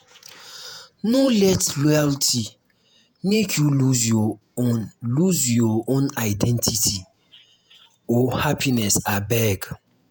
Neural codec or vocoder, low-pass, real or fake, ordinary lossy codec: vocoder, 48 kHz, 128 mel bands, Vocos; none; fake; none